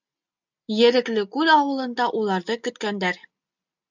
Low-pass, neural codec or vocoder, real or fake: 7.2 kHz; none; real